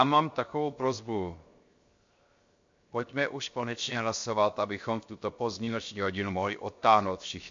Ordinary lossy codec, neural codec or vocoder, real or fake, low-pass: MP3, 48 kbps; codec, 16 kHz, 0.7 kbps, FocalCodec; fake; 7.2 kHz